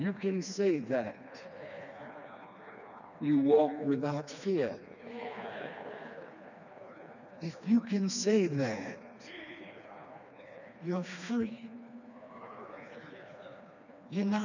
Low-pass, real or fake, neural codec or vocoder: 7.2 kHz; fake; codec, 16 kHz, 2 kbps, FreqCodec, smaller model